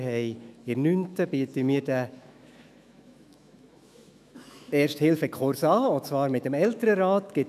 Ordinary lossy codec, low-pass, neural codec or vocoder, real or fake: none; 14.4 kHz; autoencoder, 48 kHz, 128 numbers a frame, DAC-VAE, trained on Japanese speech; fake